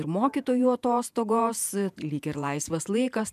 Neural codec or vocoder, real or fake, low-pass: vocoder, 48 kHz, 128 mel bands, Vocos; fake; 14.4 kHz